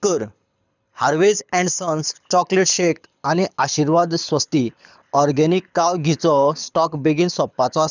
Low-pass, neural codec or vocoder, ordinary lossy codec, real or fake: 7.2 kHz; codec, 24 kHz, 6 kbps, HILCodec; none; fake